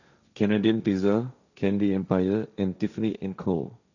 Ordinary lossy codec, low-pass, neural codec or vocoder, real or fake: none; 7.2 kHz; codec, 16 kHz, 1.1 kbps, Voila-Tokenizer; fake